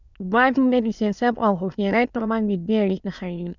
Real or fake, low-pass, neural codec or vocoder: fake; 7.2 kHz; autoencoder, 22.05 kHz, a latent of 192 numbers a frame, VITS, trained on many speakers